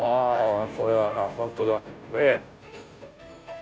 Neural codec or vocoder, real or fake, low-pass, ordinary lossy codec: codec, 16 kHz, 0.5 kbps, FunCodec, trained on Chinese and English, 25 frames a second; fake; none; none